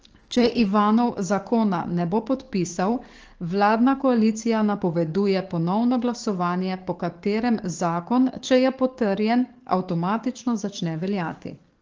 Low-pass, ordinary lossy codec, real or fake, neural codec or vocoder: 7.2 kHz; Opus, 16 kbps; fake; codec, 44.1 kHz, 7.8 kbps, DAC